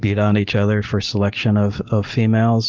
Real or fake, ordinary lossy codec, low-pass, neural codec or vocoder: real; Opus, 32 kbps; 7.2 kHz; none